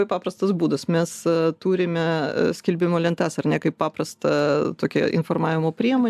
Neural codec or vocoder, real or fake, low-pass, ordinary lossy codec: none; real; 14.4 kHz; AAC, 96 kbps